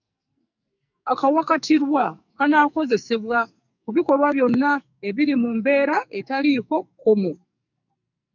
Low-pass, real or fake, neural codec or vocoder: 7.2 kHz; fake; codec, 44.1 kHz, 2.6 kbps, SNAC